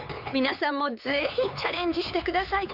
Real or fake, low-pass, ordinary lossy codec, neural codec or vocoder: fake; 5.4 kHz; none; codec, 16 kHz, 4 kbps, X-Codec, HuBERT features, trained on LibriSpeech